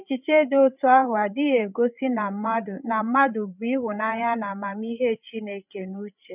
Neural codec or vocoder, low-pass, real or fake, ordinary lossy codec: codec, 16 kHz, 8 kbps, FreqCodec, larger model; 3.6 kHz; fake; none